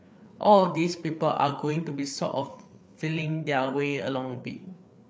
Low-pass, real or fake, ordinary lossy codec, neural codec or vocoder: none; fake; none; codec, 16 kHz, 4 kbps, FreqCodec, larger model